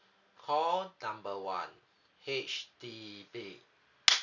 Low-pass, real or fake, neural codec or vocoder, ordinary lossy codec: 7.2 kHz; real; none; none